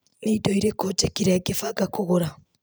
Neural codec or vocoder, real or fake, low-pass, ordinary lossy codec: none; real; none; none